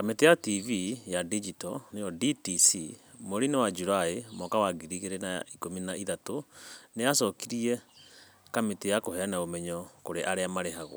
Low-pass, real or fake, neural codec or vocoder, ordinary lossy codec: none; real; none; none